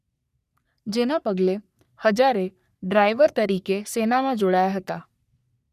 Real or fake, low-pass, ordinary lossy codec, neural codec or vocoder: fake; 14.4 kHz; none; codec, 44.1 kHz, 3.4 kbps, Pupu-Codec